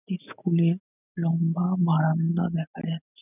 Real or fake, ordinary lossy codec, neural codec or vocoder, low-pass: real; none; none; 3.6 kHz